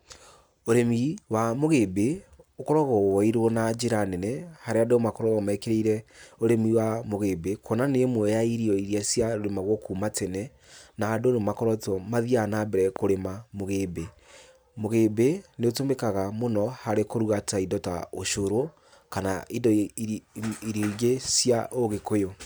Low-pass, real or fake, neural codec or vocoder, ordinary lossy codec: none; real; none; none